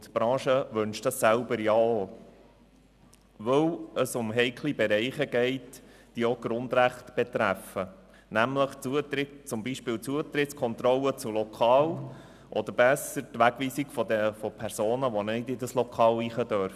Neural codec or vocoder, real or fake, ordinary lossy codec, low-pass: none; real; none; 14.4 kHz